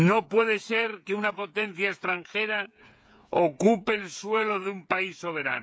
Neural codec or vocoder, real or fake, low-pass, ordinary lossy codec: codec, 16 kHz, 8 kbps, FreqCodec, smaller model; fake; none; none